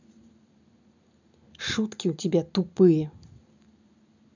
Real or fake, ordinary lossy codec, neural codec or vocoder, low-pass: real; none; none; 7.2 kHz